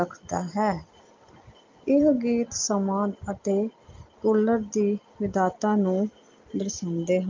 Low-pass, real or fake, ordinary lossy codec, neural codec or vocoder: 7.2 kHz; real; Opus, 24 kbps; none